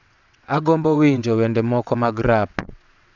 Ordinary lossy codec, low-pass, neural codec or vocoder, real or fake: none; 7.2 kHz; vocoder, 22.05 kHz, 80 mel bands, WaveNeXt; fake